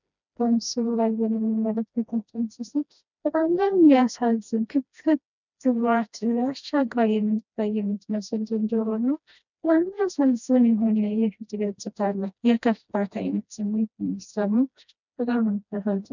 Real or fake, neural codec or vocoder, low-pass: fake; codec, 16 kHz, 1 kbps, FreqCodec, smaller model; 7.2 kHz